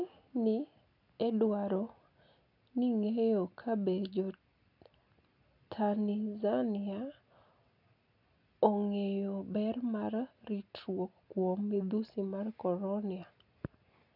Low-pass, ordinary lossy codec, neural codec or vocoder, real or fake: 5.4 kHz; none; none; real